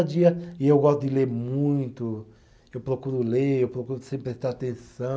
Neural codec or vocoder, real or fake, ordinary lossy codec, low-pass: none; real; none; none